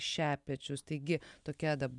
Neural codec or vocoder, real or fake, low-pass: none; real; 10.8 kHz